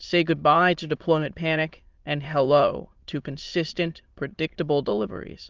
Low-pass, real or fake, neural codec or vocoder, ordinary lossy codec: 7.2 kHz; fake; autoencoder, 22.05 kHz, a latent of 192 numbers a frame, VITS, trained on many speakers; Opus, 32 kbps